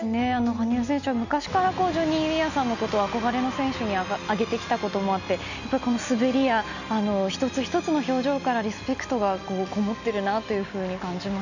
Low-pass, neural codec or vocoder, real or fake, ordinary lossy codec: 7.2 kHz; none; real; none